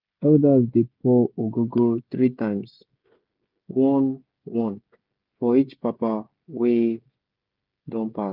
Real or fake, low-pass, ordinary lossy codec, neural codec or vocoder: fake; 5.4 kHz; Opus, 32 kbps; codec, 16 kHz, 16 kbps, FreqCodec, smaller model